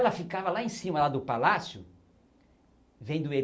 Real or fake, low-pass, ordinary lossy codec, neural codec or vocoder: real; none; none; none